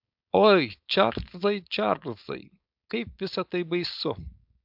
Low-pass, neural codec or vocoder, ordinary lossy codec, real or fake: 5.4 kHz; codec, 16 kHz, 4.8 kbps, FACodec; AAC, 48 kbps; fake